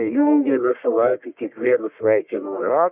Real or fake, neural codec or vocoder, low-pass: fake; codec, 44.1 kHz, 1.7 kbps, Pupu-Codec; 3.6 kHz